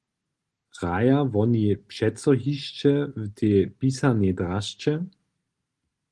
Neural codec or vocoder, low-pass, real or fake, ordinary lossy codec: none; 10.8 kHz; real; Opus, 24 kbps